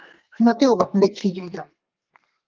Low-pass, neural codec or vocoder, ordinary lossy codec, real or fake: 7.2 kHz; codec, 32 kHz, 1.9 kbps, SNAC; Opus, 32 kbps; fake